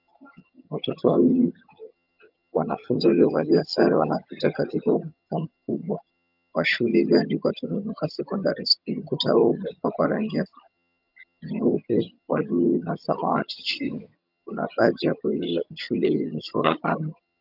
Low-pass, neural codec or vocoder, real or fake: 5.4 kHz; vocoder, 22.05 kHz, 80 mel bands, HiFi-GAN; fake